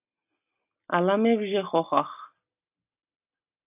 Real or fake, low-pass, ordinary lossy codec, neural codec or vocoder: real; 3.6 kHz; AAC, 32 kbps; none